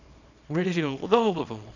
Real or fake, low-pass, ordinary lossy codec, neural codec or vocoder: fake; 7.2 kHz; none; codec, 24 kHz, 0.9 kbps, WavTokenizer, small release